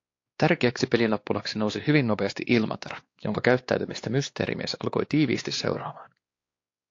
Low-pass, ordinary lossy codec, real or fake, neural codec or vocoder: 7.2 kHz; AAC, 48 kbps; fake; codec, 16 kHz, 2 kbps, X-Codec, WavLM features, trained on Multilingual LibriSpeech